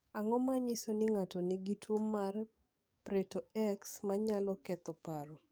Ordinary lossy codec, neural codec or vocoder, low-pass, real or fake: none; codec, 44.1 kHz, 7.8 kbps, DAC; none; fake